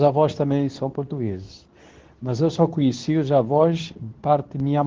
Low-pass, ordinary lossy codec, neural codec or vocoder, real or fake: 7.2 kHz; Opus, 16 kbps; codec, 24 kHz, 0.9 kbps, WavTokenizer, medium speech release version 2; fake